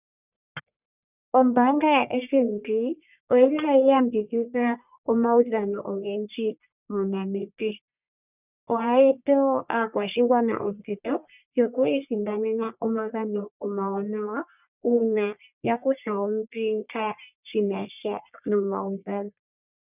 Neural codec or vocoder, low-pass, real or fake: codec, 44.1 kHz, 1.7 kbps, Pupu-Codec; 3.6 kHz; fake